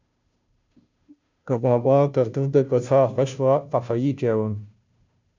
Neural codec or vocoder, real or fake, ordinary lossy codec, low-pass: codec, 16 kHz, 0.5 kbps, FunCodec, trained on Chinese and English, 25 frames a second; fake; MP3, 64 kbps; 7.2 kHz